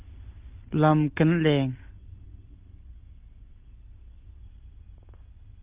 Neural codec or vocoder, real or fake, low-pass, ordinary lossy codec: none; real; 3.6 kHz; Opus, 24 kbps